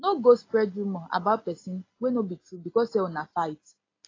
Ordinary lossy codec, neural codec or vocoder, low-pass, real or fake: AAC, 32 kbps; none; 7.2 kHz; real